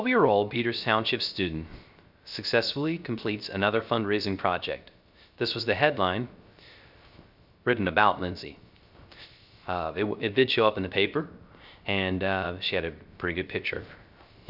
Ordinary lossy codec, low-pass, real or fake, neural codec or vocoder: Opus, 64 kbps; 5.4 kHz; fake; codec, 16 kHz, 0.3 kbps, FocalCodec